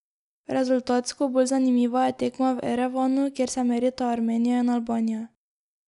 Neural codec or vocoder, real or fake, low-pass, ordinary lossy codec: none; real; 10.8 kHz; none